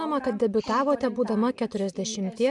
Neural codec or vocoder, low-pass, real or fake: none; 10.8 kHz; real